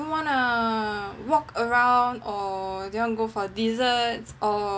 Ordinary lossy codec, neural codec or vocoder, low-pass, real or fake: none; none; none; real